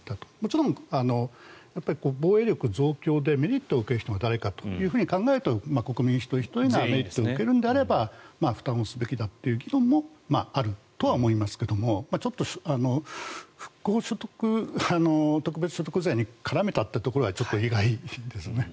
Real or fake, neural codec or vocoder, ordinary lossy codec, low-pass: real; none; none; none